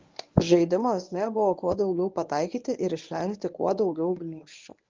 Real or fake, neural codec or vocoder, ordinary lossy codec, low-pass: fake; codec, 24 kHz, 0.9 kbps, WavTokenizer, medium speech release version 1; Opus, 24 kbps; 7.2 kHz